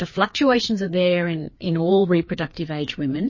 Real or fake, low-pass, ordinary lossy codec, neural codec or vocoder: fake; 7.2 kHz; MP3, 32 kbps; codec, 16 kHz, 2 kbps, FreqCodec, larger model